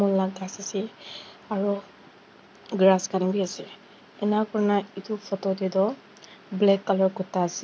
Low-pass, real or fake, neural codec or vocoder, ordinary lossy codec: none; real; none; none